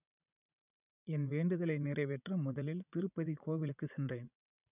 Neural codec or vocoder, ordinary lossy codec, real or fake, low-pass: vocoder, 44.1 kHz, 80 mel bands, Vocos; none; fake; 3.6 kHz